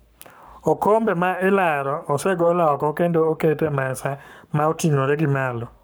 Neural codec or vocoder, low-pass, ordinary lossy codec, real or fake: codec, 44.1 kHz, 7.8 kbps, Pupu-Codec; none; none; fake